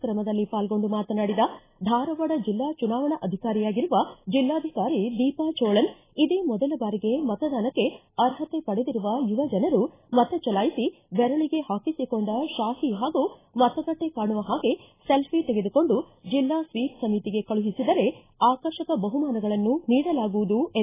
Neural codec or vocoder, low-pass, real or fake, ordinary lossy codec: none; 3.6 kHz; real; AAC, 16 kbps